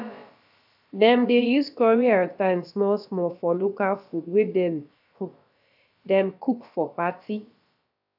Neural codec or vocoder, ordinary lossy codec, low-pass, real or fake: codec, 16 kHz, about 1 kbps, DyCAST, with the encoder's durations; AAC, 48 kbps; 5.4 kHz; fake